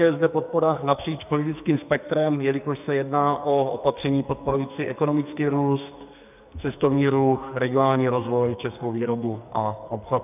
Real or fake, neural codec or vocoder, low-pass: fake; codec, 44.1 kHz, 2.6 kbps, SNAC; 3.6 kHz